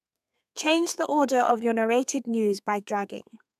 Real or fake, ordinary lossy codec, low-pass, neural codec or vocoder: fake; none; 14.4 kHz; codec, 32 kHz, 1.9 kbps, SNAC